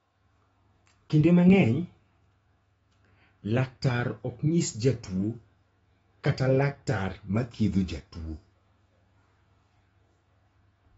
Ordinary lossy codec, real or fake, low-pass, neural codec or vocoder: AAC, 24 kbps; fake; 19.8 kHz; autoencoder, 48 kHz, 128 numbers a frame, DAC-VAE, trained on Japanese speech